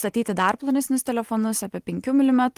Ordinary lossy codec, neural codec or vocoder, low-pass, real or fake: Opus, 24 kbps; vocoder, 44.1 kHz, 128 mel bands every 512 samples, BigVGAN v2; 14.4 kHz; fake